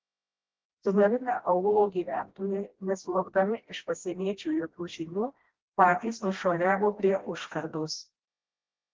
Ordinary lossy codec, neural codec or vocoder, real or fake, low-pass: Opus, 16 kbps; codec, 16 kHz, 1 kbps, FreqCodec, smaller model; fake; 7.2 kHz